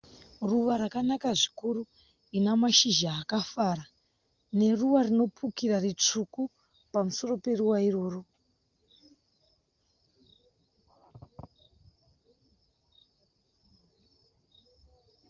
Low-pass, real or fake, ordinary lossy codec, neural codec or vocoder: 7.2 kHz; real; Opus, 24 kbps; none